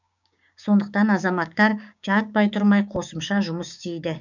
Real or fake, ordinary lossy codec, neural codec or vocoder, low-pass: fake; none; codec, 16 kHz, 6 kbps, DAC; 7.2 kHz